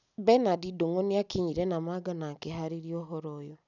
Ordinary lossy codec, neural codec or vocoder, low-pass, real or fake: none; none; 7.2 kHz; real